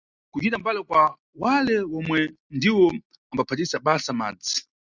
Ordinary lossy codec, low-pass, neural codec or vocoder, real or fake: Opus, 64 kbps; 7.2 kHz; none; real